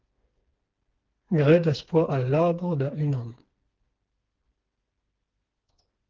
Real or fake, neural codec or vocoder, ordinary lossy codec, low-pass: fake; codec, 16 kHz, 4 kbps, FreqCodec, smaller model; Opus, 16 kbps; 7.2 kHz